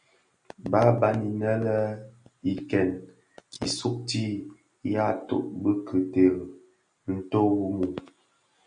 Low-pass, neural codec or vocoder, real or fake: 9.9 kHz; none; real